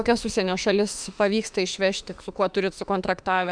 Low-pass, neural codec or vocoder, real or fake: 9.9 kHz; autoencoder, 48 kHz, 32 numbers a frame, DAC-VAE, trained on Japanese speech; fake